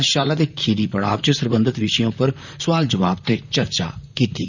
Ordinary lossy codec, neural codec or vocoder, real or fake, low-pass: none; vocoder, 44.1 kHz, 128 mel bands, Pupu-Vocoder; fake; 7.2 kHz